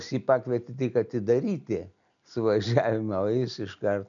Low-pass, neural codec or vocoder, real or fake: 7.2 kHz; none; real